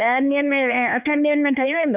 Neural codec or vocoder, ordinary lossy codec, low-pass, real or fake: codec, 16 kHz, 4 kbps, X-Codec, HuBERT features, trained on LibriSpeech; none; 3.6 kHz; fake